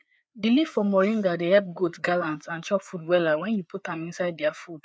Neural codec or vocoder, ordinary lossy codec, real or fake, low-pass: codec, 16 kHz, 4 kbps, FreqCodec, larger model; none; fake; none